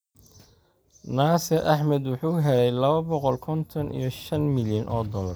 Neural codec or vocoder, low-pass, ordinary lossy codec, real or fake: none; none; none; real